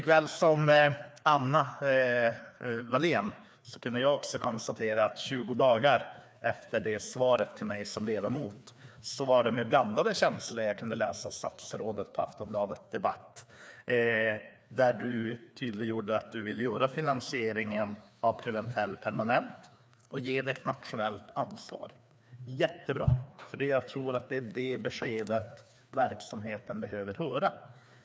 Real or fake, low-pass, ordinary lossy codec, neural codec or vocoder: fake; none; none; codec, 16 kHz, 2 kbps, FreqCodec, larger model